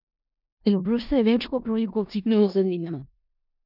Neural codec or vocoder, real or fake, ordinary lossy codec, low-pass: codec, 16 kHz in and 24 kHz out, 0.4 kbps, LongCat-Audio-Codec, four codebook decoder; fake; none; 5.4 kHz